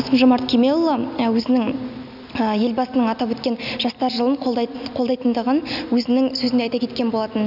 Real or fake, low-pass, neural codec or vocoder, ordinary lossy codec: real; 5.4 kHz; none; none